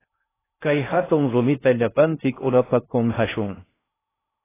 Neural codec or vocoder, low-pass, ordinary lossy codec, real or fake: codec, 16 kHz in and 24 kHz out, 0.6 kbps, FocalCodec, streaming, 2048 codes; 3.6 kHz; AAC, 16 kbps; fake